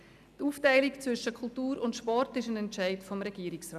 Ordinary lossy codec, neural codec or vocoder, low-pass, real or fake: none; none; 14.4 kHz; real